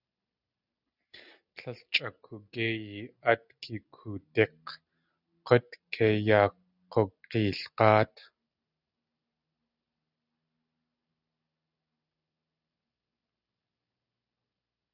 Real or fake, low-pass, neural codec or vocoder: real; 5.4 kHz; none